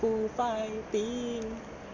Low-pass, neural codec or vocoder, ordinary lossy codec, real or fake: 7.2 kHz; none; none; real